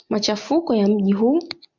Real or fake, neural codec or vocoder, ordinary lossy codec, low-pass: real; none; MP3, 64 kbps; 7.2 kHz